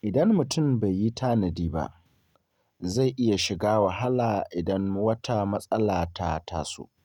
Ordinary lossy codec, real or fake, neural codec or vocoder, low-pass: none; real; none; 19.8 kHz